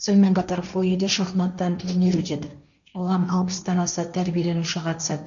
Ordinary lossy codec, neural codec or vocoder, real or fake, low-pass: MP3, 96 kbps; codec, 16 kHz, 1.1 kbps, Voila-Tokenizer; fake; 7.2 kHz